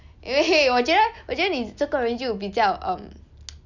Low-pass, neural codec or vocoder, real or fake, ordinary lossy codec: 7.2 kHz; none; real; none